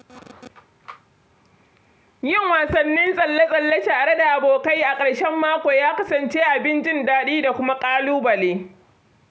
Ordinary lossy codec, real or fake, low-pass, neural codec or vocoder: none; real; none; none